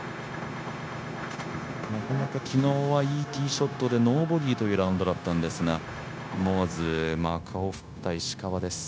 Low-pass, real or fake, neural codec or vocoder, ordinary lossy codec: none; fake; codec, 16 kHz, 0.9 kbps, LongCat-Audio-Codec; none